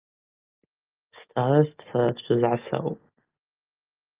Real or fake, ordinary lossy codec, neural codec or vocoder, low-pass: real; Opus, 32 kbps; none; 3.6 kHz